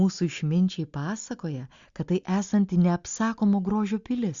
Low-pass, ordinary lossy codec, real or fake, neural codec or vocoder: 7.2 kHz; Opus, 64 kbps; real; none